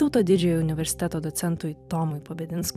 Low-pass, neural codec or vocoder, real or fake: 14.4 kHz; vocoder, 44.1 kHz, 128 mel bands every 256 samples, BigVGAN v2; fake